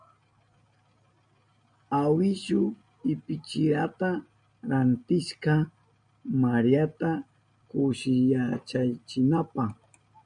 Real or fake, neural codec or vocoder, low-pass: real; none; 9.9 kHz